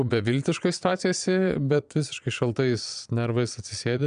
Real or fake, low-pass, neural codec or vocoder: fake; 9.9 kHz; vocoder, 22.05 kHz, 80 mel bands, Vocos